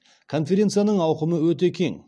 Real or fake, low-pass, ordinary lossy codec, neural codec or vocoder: real; none; none; none